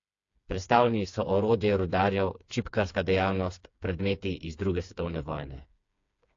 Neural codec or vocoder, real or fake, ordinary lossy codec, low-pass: codec, 16 kHz, 4 kbps, FreqCodec, smaller model; fake; AAC, 48 kbps; 7.2 kHz